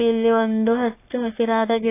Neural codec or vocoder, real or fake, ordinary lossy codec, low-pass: codec, 44.1 kHz, 3.4 kbps, Pupu-Codec; fake; AAC, 24 kbps; 3.6 kHz